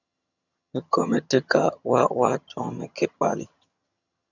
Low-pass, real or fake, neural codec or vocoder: 7.2 kHz; fake; vocoder, 22.05 kHz, 80 mel bands, HiFi-GAN